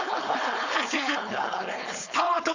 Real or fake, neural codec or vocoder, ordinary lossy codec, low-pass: fake; codec, 16 kHz, 4.8 kbps, FACodec; Opus, 64 kbps; 7.2 kHz